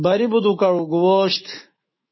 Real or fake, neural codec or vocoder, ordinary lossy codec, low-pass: fake; autoencoder, 48 kHz, 128 numbers a frame, DAC-VAE, trained on Japanese speech; MP3, 24 kbps; 7.2 kHz